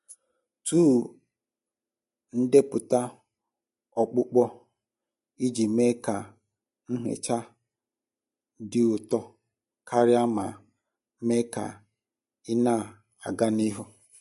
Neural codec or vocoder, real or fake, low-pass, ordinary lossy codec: none; real; 14.4 kHz; MP3, 48 kbps